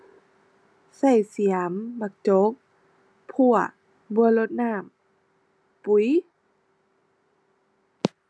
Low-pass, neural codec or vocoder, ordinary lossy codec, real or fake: none; none; none; real